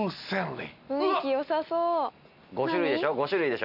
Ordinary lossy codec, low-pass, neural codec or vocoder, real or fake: none; 5.4 kHz; none; real